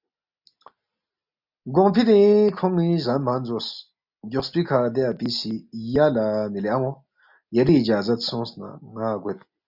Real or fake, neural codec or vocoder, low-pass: real; none; 5.4 kHz